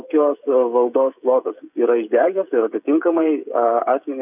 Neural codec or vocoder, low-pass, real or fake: codec, 16 kHz, 16 kbps, FreqCodec, smaller model; 3.6 kHz; fake